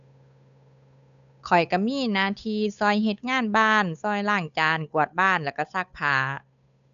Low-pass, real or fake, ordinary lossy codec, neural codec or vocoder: 7.2 kHz; fake; none; codec, 16 kHz, 8 kbps, FunCodec, trained on Chinese and English, 25 frames a second